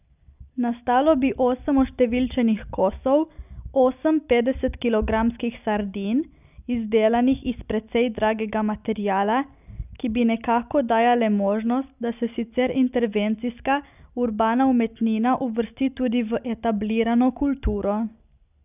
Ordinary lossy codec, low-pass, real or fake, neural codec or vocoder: none; 3.6 kHz; real; none